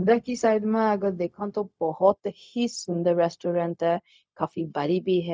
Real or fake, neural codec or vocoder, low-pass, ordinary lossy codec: fake; codec, 16 kHz, 0.4 kbps, LongCat-Audio-Codec; none; none